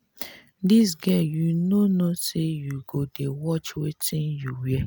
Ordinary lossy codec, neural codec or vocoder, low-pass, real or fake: none; none; none; real